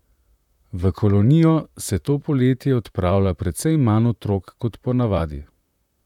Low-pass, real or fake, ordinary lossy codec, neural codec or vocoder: 19.8 kHz; fake; none; vocoder, 44.1 kHz, 128 mel bands, Pupu-Vocoder